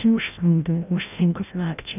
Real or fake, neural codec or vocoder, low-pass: fake; codec, 16 kHz, 0.5 kbps, FreqCodec, larger model; 3.6 kHz